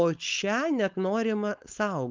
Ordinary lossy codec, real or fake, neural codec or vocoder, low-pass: Opus, 24 kbps; fake; codec, 16 kHz, 4.8 kbps, FACodec; 7.2 kHz